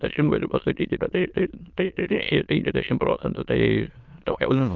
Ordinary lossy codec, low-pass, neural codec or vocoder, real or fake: Opus, 32 kbps; 7.2 kHz; autoencoder, 22.05 kHz, a latent of 192 numbers a frame, VITS, trained on many speakers; fake